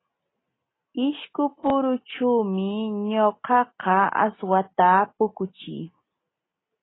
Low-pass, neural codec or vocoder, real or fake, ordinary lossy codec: 7.2 kHz; none; real; AAC, 16 kbps